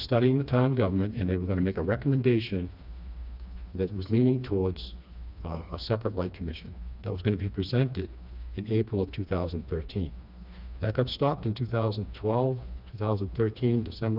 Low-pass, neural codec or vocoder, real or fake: 5.4 kHz; codec, 16 kHz, 2 kbps, FreqCodec, smaller model; fake